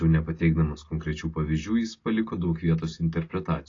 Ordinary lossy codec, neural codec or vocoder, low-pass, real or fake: AAC, 32 kbps; none; 7.2 kHz; real